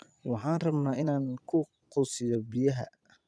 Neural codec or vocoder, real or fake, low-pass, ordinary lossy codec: vocoder, 22.05 kHz, 80 mel bands, Vocos; fake; none; none